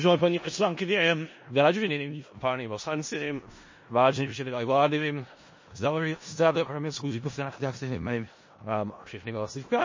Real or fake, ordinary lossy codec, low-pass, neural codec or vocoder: fake; MP3, 32 kbps; 7.2 kHz; codec, 16 kHz in and 24 kHz out, 0.4 kbps, LongCat-Audio-Codec, four codebook decoder